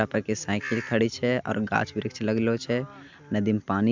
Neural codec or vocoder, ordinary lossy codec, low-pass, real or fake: none; MP3, 64 kbps; 7.2 kHz; real